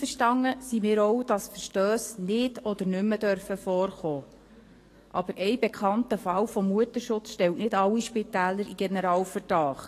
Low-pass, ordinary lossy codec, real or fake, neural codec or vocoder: 14.4 kHz; AAC, 48 kbps; fake; codec, 44.1 kHz, 7.8 kbps, DAC